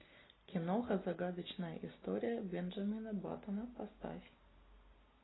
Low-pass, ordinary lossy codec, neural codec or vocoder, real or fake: 7.2 kHz; AAC, 16 kbps; none; real